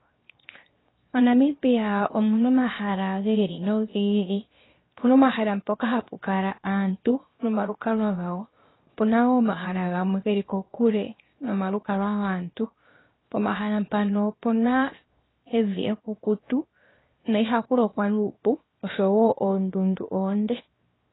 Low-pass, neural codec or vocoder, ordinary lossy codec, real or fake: 7.2 kHz; codec, 16 kHz, 0.7 kbps, FocalCodec; AAC, 16 kbps; fake